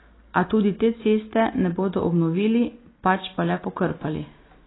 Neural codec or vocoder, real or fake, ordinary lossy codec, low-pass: none; real; AAC, 16 kbps; 7.2 kHz